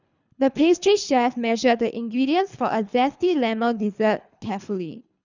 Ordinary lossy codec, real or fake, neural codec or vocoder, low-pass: none; fake; codec, 24 kHz, 3 kbps, HILCodec; 7.2 kHz